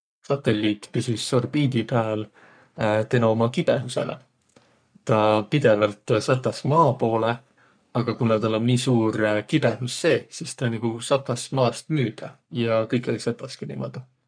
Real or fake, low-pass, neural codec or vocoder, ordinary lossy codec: fake; 9.9 kHz; codec, 44.1 kHz, 3.4 kbps, Pupu-Codec; none